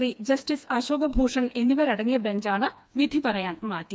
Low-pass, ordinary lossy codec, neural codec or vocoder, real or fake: none; none; codec, 16 kHz, 2 kbps, FreqCodec, smaller model; fake